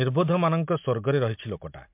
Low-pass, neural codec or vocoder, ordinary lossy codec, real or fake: 3.6 kHz; none; MP3, 32 kbps; real